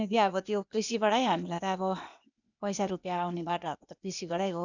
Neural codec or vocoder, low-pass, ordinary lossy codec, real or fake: codec, 16 kHz, 0.8 kbps, ZipCodec; 7.2 kHz; none; fake